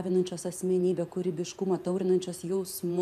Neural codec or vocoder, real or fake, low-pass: vocoder, 48 kHz, 128 mel bands, Vocos; fake; 14.4 kHz